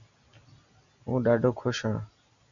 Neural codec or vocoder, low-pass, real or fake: none; 7.2 kHz; real